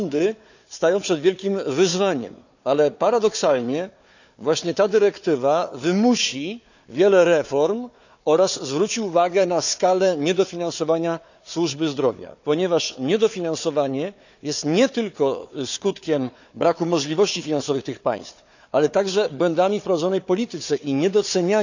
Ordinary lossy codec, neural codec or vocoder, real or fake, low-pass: none; codec, 16 kHz, 4 kbps, FunCodec, trained on Chinese and English, 50 frames a second; fake; 7.2 kHz